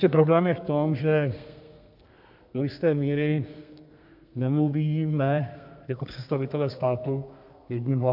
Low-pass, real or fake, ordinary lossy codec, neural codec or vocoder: 5.4 kHz; fake; AAC, 48 kbps; codec, 32 kHz, 1.9 kbps, SNAC